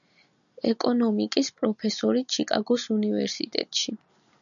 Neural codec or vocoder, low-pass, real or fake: none; 7.2 kHz; real